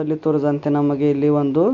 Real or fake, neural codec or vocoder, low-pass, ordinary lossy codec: real; none; 7.2 kHz; none